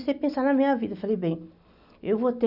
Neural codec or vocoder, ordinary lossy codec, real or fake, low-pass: none; none; real; 5.4 kHz